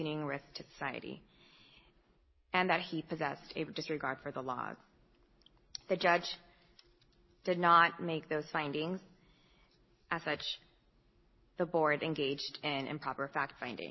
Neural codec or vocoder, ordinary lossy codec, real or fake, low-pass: none; MP3, 24 kbps; real; 7.2 kHz